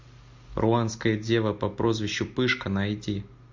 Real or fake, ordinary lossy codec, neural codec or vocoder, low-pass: real; MP3, 48 kbps; none; 7.2 kHz